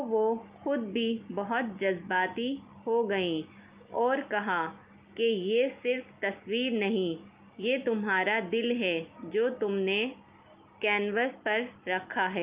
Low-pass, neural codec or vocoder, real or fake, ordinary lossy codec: 3.6 kHz; none; real; Opus, 32 kbps